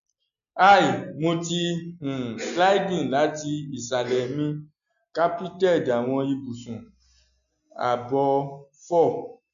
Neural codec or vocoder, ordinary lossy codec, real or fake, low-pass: none; none; real; 7.2 kHz